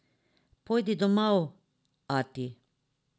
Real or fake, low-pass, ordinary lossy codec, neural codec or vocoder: real; none; none; none